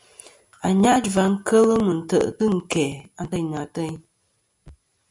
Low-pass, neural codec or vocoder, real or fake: 10.8 kHz; none; real